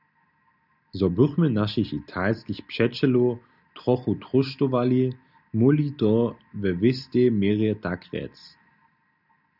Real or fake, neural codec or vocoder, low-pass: real; none; 5.4 kHz